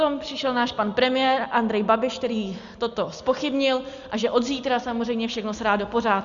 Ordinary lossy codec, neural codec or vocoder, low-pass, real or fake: Opus, 64 kbps; none; 7.2 kHz; real